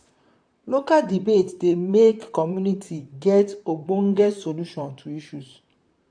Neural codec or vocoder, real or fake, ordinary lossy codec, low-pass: vocoder, 44.1 kHz, 128 mel bands, Pupu-Vocoder; fake; AAC, 64 kbps; 9.9 kHz